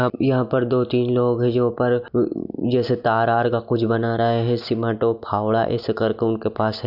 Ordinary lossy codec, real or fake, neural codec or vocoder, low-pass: none; real; none; 5.4 kHz